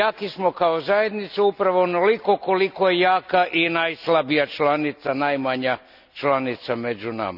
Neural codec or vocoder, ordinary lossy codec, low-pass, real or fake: none; none; 5.4 kHz; real